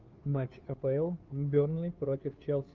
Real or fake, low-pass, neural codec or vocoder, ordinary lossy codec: fake; 7.2 kHz; codec, 16 kHz, 2 kbps, FunCodec, trained on Chinese and English, 25 frames a second; Opus, 32 kbps